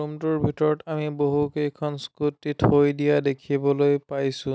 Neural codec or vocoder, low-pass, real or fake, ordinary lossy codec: none; none; real; none